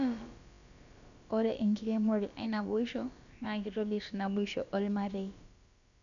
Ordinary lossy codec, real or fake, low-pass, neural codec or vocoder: none; fake; 7.2 kHz; codec, 16 kHz, about 1 kbps, DyCAST, with the encoder's durations